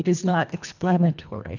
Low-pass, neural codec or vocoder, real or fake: 7.2 kHz; codec, 24 kHz, 1.5 kbps, HILCodec; fake